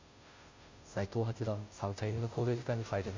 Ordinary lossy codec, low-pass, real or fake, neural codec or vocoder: MP3, 64 kbps; 7.2 kHz; fake; codec, 16 kHz, 0.5 kbps, FunCodec, trained on Chinese and English, 25 frames a second